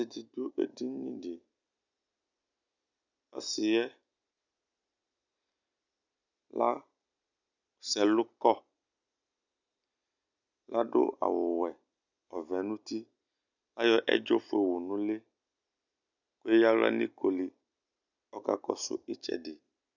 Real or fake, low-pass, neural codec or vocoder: real; 7.2 kHz; none